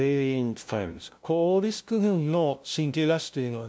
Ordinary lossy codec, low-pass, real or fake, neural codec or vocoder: none; none; fake; codec, 16 kHz, 0.5 kbps, FunCodec, trained on LibriTTS, 25 frames a second